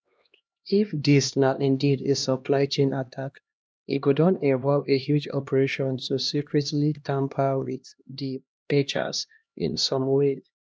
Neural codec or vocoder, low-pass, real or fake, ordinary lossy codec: codec, 16 kHz, 1 kbps, X-Codec, HuBERT features, trained on LibriSpeech; none; fake; none